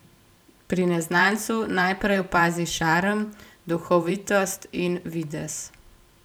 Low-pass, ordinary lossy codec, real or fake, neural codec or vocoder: none; none; fake; vocoder, 44.1 kHz, 128 mel bands every 512 samples, BigVGAN v2